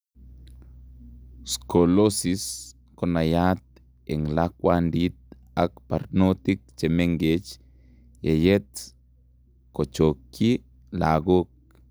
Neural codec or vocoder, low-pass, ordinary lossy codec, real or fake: none; none; none; real